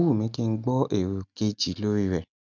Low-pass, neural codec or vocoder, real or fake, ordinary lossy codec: 7.2 kHz; none; real; none